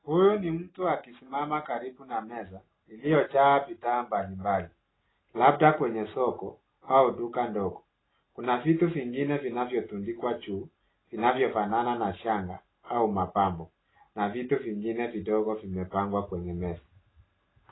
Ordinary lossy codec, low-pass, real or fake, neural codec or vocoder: AAC, 16 kbps; 7.2 kHz; real; none